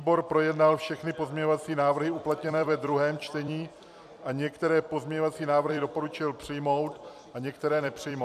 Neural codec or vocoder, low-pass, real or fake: vocoder, 44.1 kHz, 128 mel bands every 256 samples, BigVGAN v2; 14.4 kHz; fake